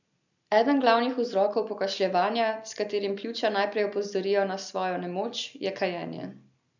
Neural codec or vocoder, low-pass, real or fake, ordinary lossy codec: none; 7.2 kHz; real; none